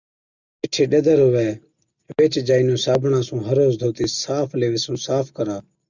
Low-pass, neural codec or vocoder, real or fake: 7.2 kHz; none; real